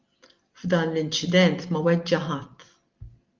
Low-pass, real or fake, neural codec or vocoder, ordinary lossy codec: 7.2 kHz; real; none; Opus, 32 kbps